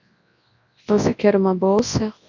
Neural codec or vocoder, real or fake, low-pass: codec, 24 kHz, 0.9 kbps, WavTokenizer, large speech release; fake; 7.2 kHz